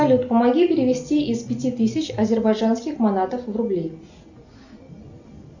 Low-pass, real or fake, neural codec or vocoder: 7.2 kHz; real; none